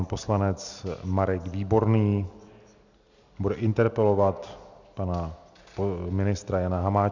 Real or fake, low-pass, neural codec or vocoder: real; 7.2 kHz; none